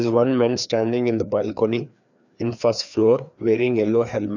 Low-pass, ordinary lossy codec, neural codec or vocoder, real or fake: 7.2 kHz; none; codec, 16 kHz, 2 kbps, FreqCodec, larger model; fake